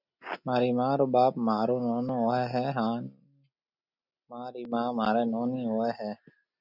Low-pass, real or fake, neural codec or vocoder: 5.4 kHz; real; none